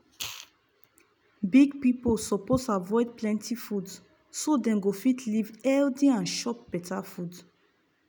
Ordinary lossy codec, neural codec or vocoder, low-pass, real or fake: none; none; none; real